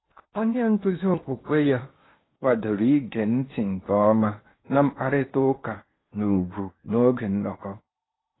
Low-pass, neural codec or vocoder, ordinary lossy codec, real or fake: 7.2 kHz; codec, 16 kHz in and 24 kHz out, 0.8 kbps, FocalCodec, streaming, 65536 codes; AAC, 16 kbps; fake